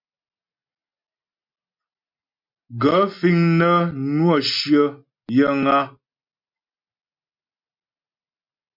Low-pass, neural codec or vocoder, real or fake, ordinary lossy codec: 5.4 kHz; none; real; MP3, 48 kbps